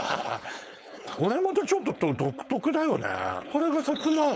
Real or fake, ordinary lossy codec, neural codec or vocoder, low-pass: fake; none; codec, 16 kHz, 4.8 kbps, FACodec; none